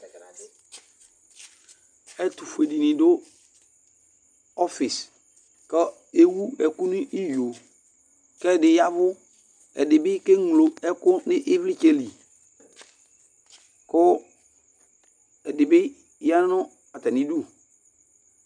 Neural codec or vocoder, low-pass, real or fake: none; 9.9 kHz; real